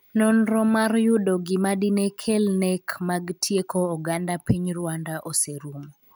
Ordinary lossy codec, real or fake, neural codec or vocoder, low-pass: none; real; none; none